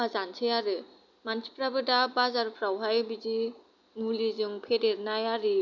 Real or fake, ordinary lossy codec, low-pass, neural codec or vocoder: real; none; 7.2 kHz; none